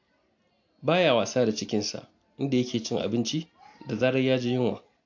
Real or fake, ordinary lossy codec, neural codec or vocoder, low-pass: real; AAC, 48 kbps; none; 7.2 kHz